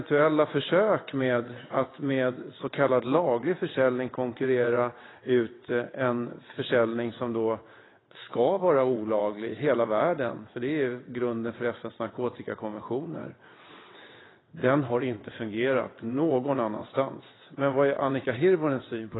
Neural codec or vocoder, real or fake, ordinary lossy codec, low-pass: vocoder, 22.05 kHz, 80 mel bands, WaveNeXt; fake; AAC, 16 kbps; 7.2 kHz